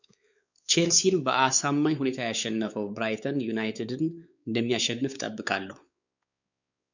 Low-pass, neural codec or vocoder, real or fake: 7.2 kHz; codec, 16 kHz, 4 kbps, X-Codec, WavLM features, trained on Multilingual LibriSpeech; fake